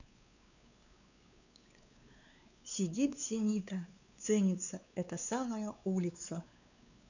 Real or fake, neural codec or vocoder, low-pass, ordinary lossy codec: fake; codec, 16 kHz, 4 kbps, X-Codec, WavLM features, trained on Multilingual LibriSpeech; 7.2 kHz; none